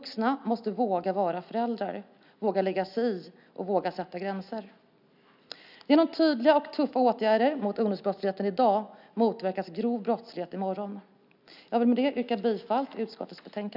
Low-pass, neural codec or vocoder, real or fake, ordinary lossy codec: 5.4 kHz; none; real; none